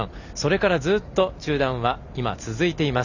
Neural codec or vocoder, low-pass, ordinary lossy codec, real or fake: none; 7.2 kHz; none; real